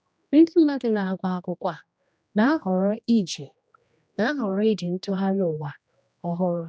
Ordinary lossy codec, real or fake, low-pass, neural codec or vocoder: none; fake; none; codec, 16 kHz, 1 kbps, X-Codec, HuBERT features, trained on general audio